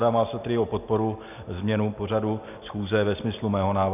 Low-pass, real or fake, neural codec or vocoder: 3.6 kHz; real; none